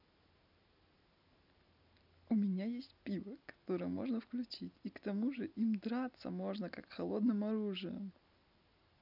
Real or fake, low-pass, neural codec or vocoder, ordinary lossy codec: real; 5.4 kHz; none; none